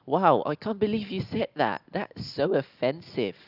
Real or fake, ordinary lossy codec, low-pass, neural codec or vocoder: real; none; 5.4 kHz; none